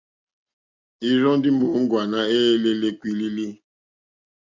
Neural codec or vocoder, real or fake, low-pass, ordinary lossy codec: none; real; 7.2 kHz; MP3, 64 kbps